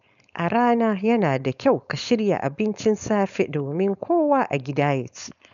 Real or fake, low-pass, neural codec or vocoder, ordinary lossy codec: fake; 7.2 kHz; codec, 16 kHz, 4.8 kbps, FACodec; none